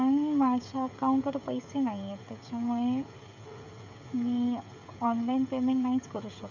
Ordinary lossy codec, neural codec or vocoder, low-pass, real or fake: none; codec, 16 kHz, 16 kbps, FreqCodec, smaller model; 7.2 kHz; fake